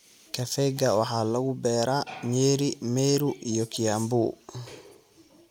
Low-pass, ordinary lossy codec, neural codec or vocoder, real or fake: 19.8 kHz; none; none; real